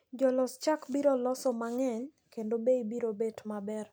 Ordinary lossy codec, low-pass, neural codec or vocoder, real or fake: none; none; none; real